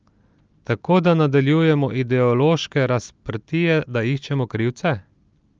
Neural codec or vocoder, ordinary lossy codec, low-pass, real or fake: none; Opus, 24 kbps; 7.2 kHz; real